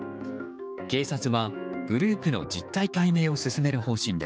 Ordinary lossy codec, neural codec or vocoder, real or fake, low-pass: none; codec, 16 kHz, 4 kbps, X-Codec, HuBERT features, trained on general audio; fake; none